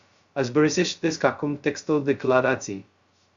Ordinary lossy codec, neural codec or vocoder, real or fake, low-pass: Opus, 64 kbps; codec, 16 kHz, 0.2 kbps, FocalCodec; fake; 7.2 kHz